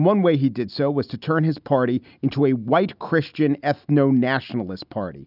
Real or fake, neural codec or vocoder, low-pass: real; none; 5.4 kHz